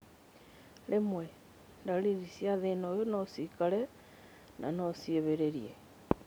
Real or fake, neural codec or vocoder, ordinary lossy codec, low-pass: real; none; none; none